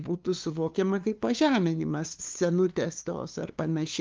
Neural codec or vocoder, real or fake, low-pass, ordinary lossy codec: codec, 16 kHz, 2 kbps, FunCodec, trained on LibriTTS, 25 frames a second; fake; 7.2 kHz; Opus, 24 kbps